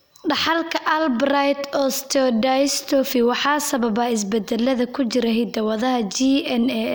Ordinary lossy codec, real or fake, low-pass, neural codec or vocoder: none; real; none; none